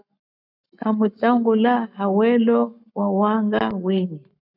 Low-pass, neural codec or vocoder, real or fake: 5.4 kHz; codec, 44.1 kHz, 7.8 kbps, Pupu-Codec; fake